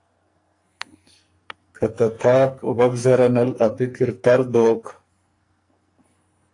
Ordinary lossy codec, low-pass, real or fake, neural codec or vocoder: AAC, 48 kbps; 10.8 kHz; fake; codec, 44.1 kHz, 2.6 kbps, SNAC